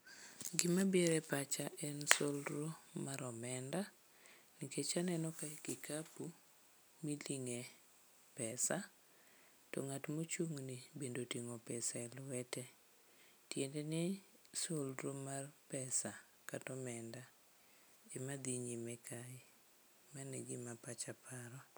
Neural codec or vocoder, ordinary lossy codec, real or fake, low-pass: none; none; real; none